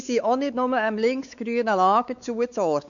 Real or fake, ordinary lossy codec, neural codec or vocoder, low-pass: fake; none; codec, 16 kHz, 4 kbps, X-Codec, WavLM features, trained on Multilingual LibriSpeech; 7.2 kHz